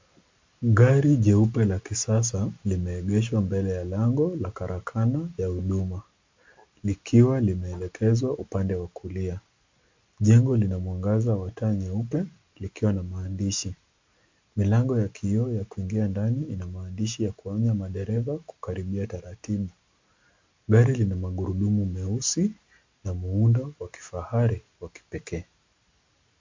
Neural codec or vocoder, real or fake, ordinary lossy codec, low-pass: none; real; MP3, 64 kbps; 7.2 kHz